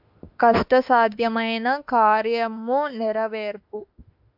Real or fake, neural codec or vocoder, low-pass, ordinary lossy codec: fake; autoencoder, 48 kHz, 32 numbers a frame, DAC-VAE, trained on Japanese speech; 5.4 kHz; AAC, 48 kbps